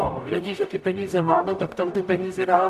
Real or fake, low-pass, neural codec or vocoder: fake; 14.4 kHz; codec, 44.1 kHz, 0.9 kbps, DAC